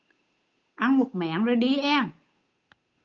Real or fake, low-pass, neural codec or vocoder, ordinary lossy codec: fake; 7.2 kHz; codec, 16 kHz, 8 kbps, FunCodec, trained on Chinese and English, 25 frames a second; Opus, 24 kbps